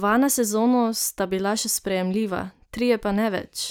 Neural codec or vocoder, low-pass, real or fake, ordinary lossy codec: none; none; real; none